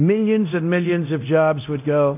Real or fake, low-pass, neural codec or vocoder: fake; 3.6 kHz; codec, 24 kHz, 0.9 kbps, DualCodec